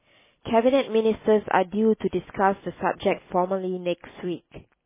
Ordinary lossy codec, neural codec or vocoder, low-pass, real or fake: MP3, 16 kbps; none; 3.6 kHz; real